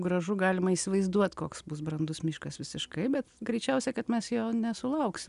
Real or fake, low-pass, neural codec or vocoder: real; 10.8 kHz; none